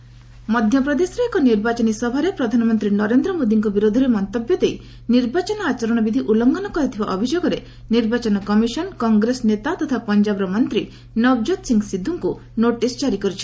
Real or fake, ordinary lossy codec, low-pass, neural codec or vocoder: real; none; none; none